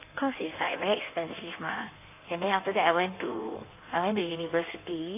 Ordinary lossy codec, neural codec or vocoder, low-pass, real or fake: AAC, 24 kbps; codec, 16 kHz in and 24 kHz out, 1.1 kbps, FireRedTTS-2 codec; 3.6 kHz; fake